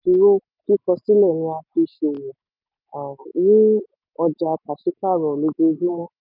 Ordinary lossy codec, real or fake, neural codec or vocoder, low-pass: none; real; none; 5.4 kHz